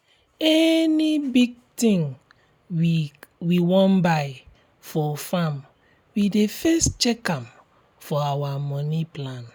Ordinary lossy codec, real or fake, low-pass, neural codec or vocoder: none; real; none; none